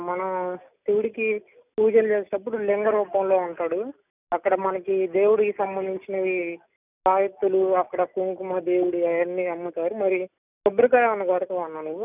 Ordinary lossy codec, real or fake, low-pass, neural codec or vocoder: none; real; 3.6 kHz; none